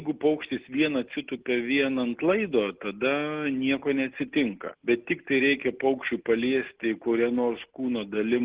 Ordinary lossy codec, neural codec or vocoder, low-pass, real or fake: Opus, 16 kbps; none; 3.6 kHz; real